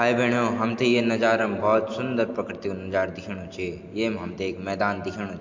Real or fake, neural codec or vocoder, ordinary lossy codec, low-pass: real; none; MP3, 48 kbps; 7.2 kHz